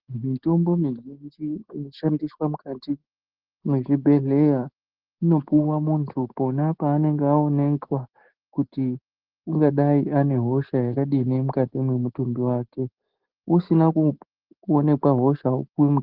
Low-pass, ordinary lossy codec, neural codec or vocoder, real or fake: 5.4 kHz; Opus, 16 kbps; none; real